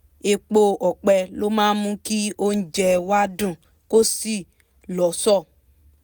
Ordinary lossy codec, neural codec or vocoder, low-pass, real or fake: none; none; none; real